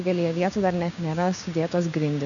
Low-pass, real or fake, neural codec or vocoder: 7.2 kHz; fake; codec, 16 kHz, 6 kbps, DAC